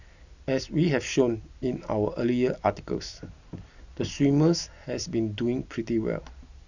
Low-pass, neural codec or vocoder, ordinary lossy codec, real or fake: 7.2 kHz; none; none; real